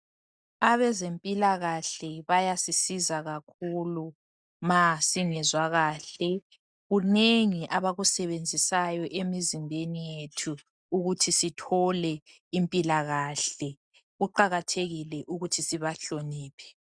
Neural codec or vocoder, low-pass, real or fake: none; 9.9 kHz; real